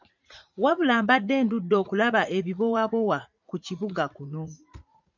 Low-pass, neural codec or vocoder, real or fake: 7.2 kHz; vocoder, 44.1 kHz, 80 mel bands, Vocos; fake